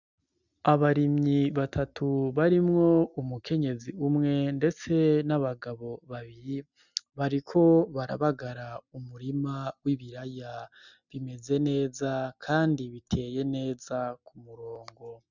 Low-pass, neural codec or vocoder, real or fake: 7.2 kHz; none; real